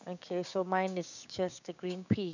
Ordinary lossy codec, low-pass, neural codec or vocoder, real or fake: none; 7.2 kHz; codec, 16 kHz, 6 kbps, DAC; fake